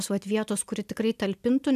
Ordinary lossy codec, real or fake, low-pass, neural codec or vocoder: AAC, 96 kbps; real; 14.4 kHz; none